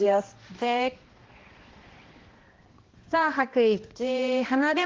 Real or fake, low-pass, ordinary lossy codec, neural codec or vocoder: fake; 7.2 kHz; Opus, 16 kbps; codec, 16 kHz, 1 kbps, X-Codec, HuBERT features, trained on balanced general audio